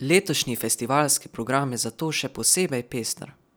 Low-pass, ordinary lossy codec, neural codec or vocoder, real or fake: none; none; none; real